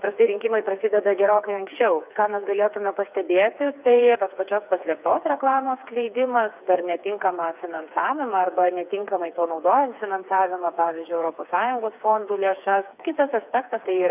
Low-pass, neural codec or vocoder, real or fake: 3.6 kHz; codec, 16 kHz, 4 kbps, FreqCodec, smaller model; fake